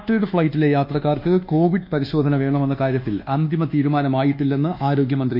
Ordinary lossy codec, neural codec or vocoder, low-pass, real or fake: none; codec, 24 kHz, 1.2 kbps, DualCodec; 5.4 kHz; fake